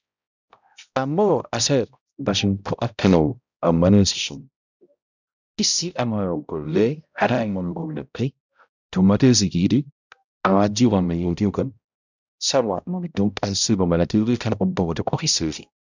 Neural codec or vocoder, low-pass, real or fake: codec, 16 kHz, 0.5 kbps, X-Codec, HuBERT features, trained on balanced general audio; 7.2 kHz; fake